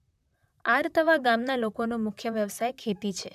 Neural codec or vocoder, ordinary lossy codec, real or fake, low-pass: vocoder, 44.1 kHz, 128 mel bands, Pupu-Vocoder; none; fake; 14.4 kHz